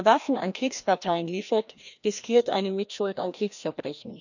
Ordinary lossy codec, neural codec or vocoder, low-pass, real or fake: none; codec, 16 kHz, 1 kbps, FreqCodec, larger model; 7.2 kHz; fake